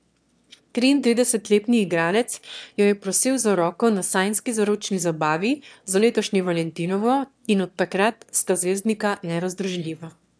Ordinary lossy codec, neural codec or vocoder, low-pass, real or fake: none; autoencoder, 22.05 kHz, a latent of 192 numbers a frame, VITS, trained on one speaker; none; fake